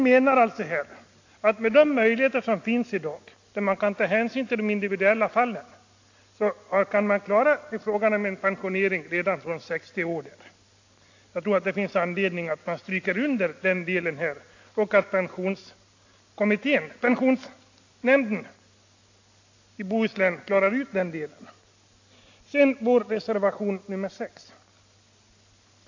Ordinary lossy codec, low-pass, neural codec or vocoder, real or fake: AAC, 48 kbps; 7.2 kHz; none; real